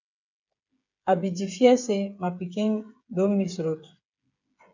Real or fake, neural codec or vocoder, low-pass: fake; codec, 16 kHz, 8 kbps, FreqCodec, smaller model; 7.2 kHz